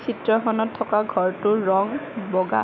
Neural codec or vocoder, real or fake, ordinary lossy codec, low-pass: autoencoder, 48 kHz, 128 numbers a frame, DAC-VAE, trained on Japanese speech; fake; none; 7.2 kHz